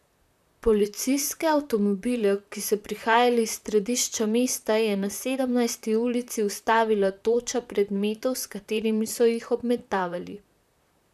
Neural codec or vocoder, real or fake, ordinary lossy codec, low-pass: vocoder, 44.1 kHz, 128 mel bands, Pupu-Vocoder; fake; none; 14.4 kHz